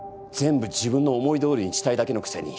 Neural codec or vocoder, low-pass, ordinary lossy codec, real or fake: none; none; none; real